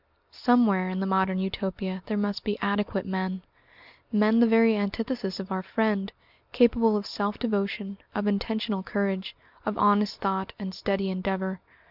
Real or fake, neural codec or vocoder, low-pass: real; none; 5.4 kHz